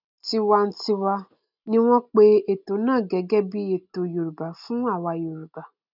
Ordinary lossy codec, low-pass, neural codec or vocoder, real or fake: none; 5.4 kHz; none; real